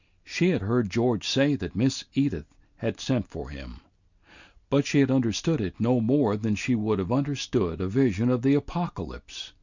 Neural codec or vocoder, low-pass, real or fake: none; 7.2 kHz; real